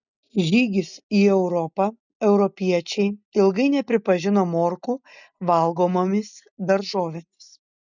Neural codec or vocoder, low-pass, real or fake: none; 7.2 kHz; real